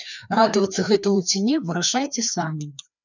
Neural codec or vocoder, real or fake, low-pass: codec, 16 kHz, 2 kbps, FreqCodec, larger model; fake; 7.2 kHz